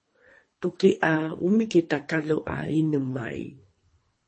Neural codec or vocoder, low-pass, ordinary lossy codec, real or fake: codec, 24 kHz, 3 kbps, HILCodec; 9.9 kHz; MP3, 32 kbps; fake